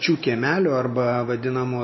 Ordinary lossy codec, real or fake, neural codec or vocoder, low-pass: MP3, 24 kbps; real; none; 7.2 kHz